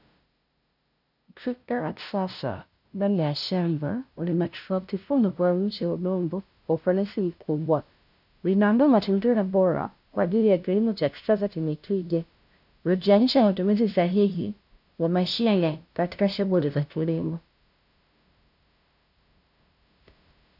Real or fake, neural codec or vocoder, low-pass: fake; codec, 16 kHz, 0.5 kbps, FunCodec, trained on LibriTTS, 25 frames a second; 5.4 kHz